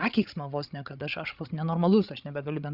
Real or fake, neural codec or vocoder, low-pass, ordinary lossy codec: fake; codec, 16 kHz, 16 kbps, FreqCodec, larger model; 5.4 kHz; Opus, 64 kbps